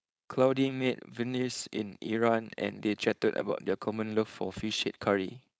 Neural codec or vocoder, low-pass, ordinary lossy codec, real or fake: codec, 16 kHz, 4.8 kbps, FACodec; none; none; fake